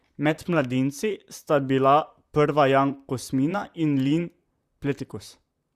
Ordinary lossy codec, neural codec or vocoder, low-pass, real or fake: Opus, 64 kbps; vocoder, 44.1 kHz, 128 mel bands, Pupu-Vocoder; 14.4 kHz; fake